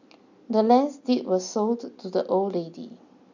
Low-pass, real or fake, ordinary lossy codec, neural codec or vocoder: 7.2 kHz; real; none; none